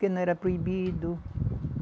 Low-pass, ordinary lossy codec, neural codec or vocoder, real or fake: none; none; none; real